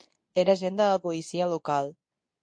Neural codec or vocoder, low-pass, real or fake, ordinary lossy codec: codec, 24 kHz, 0.9 kbps, WavTokenizer, medium speech release version 2; 9.9 kHz; fake; MP3, 48 kbps